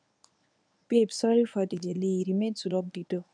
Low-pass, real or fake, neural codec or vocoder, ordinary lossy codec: 9.9 kHz; fake; codec, 24 kHz, 0.9 kbps, WavTokenizer, medium speech release version 1; none